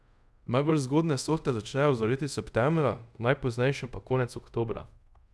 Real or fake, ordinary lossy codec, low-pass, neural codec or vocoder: fake; none; none; codec, 24 kHz, 0.5 kbps, DualCodec